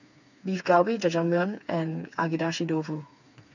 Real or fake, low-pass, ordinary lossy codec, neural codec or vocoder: fake; 7.2 kHz; none; codec, 16 kHz, 4 kbps, FreqCodec, smaller model